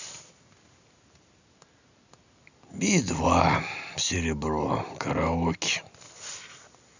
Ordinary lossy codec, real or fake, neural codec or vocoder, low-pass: none; real; none; 7.2 kHz